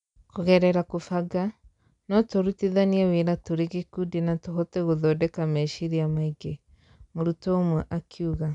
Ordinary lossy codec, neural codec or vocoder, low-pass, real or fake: none; none; 10.8 kHz; real